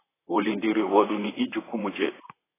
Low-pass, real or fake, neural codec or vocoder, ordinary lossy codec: 3.6 kHz; fake; vocoder, 44.1 kHz, 128 mel bands, Pupu-Vocoder; AAC, 16 kbps